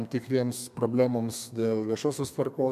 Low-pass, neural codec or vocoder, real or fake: 14.4 kHz; codec, 32 kHz, 1.9 kbps, SNAC; fake